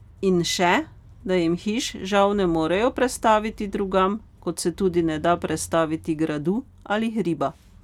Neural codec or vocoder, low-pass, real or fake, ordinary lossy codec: none; 19.8 kHz; real; none